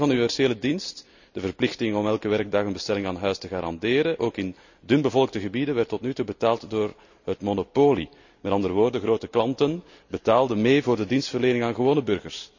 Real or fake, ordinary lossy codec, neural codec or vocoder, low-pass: real; none; none; 7.2 kHz